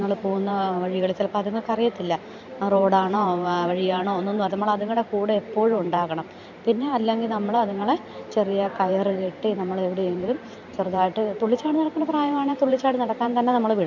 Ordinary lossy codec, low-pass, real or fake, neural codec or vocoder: none; 7.2 kHz; fake; vocoder, 44.1 kHz, 128 mel bands every 512 samples, BigVGAN v2